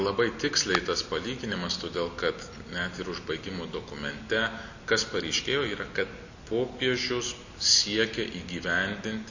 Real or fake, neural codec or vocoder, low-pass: real; none; 7.2 kHz